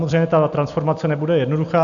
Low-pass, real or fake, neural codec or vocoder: 7.2 kHz; real; none